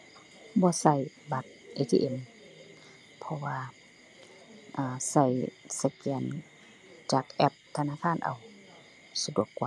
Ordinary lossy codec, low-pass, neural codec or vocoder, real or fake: none; none; none; real